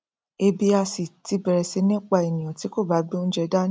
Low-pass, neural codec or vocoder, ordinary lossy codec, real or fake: none; none; none; real